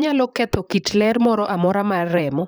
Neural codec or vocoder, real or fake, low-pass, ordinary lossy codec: vocoder, 44.1 kHz, 128 mel bands, Pupu-Vocoder; fake; none; none